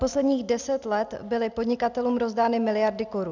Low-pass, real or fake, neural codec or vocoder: 7.2 kHz; real; none